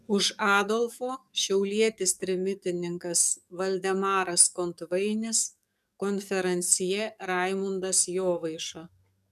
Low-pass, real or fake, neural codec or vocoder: 14.4 kHz; fake; codec, 44.1 kHz, 7.8 kbps, DAC